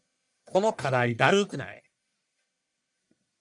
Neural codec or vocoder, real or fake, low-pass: codec, 44.1 kHz, 1.7 kbps, Pupu-Codec; fake; 10.8 kHz